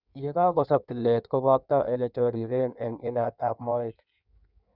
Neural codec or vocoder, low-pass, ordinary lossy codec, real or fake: codec, 16 kHz in and 24 kHz out, 1.1 kbps, FireRedTTS-2 codec; 5.4 kHz; none; fake